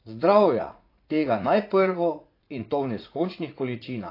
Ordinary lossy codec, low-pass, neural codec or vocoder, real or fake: AAC, 32 kbps; 5.4 kHz; vocoder, 44.1 kHz, 80 mel bands, Vocos; fake